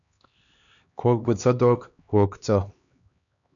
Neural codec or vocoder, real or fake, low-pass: codec, 16 kHz, 2 kbps, X-Codec, HuBERT features, trained on LibriSpeech; fake; 7.2 kHz